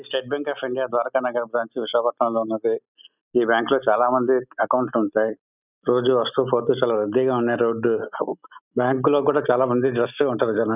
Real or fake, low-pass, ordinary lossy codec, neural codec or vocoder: real; 3.6 kHz; none; none